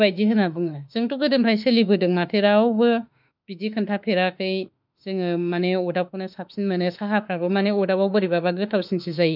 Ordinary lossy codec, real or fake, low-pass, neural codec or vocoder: none; fake; 5.4 kHz; autoencoder, 48 kHz, 32 numbers a frame, DAC-VAE, trained on Japanese speech